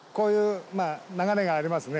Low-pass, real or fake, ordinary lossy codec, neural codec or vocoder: none; real; none; none